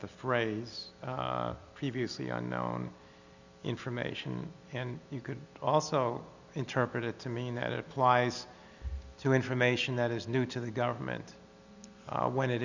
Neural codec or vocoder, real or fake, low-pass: none; real; 7.2 kHz